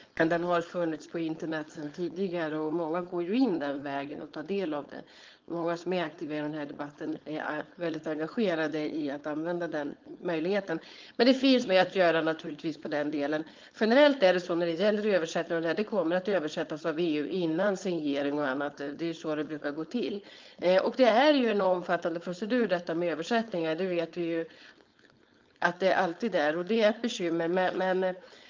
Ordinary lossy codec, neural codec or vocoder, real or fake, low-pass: Opus, 24 kbps; codec, 16 kHz, 4.8 kbps, FACodec; fake; 7.2 kHz